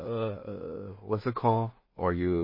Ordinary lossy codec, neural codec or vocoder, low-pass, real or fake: MP3, 24 kbps; codec, 16 kHz in and 24 kHz out, 0.4 kbps, LongCat-Audio-Codec, two codebook decoder; 5.4 kHz; fake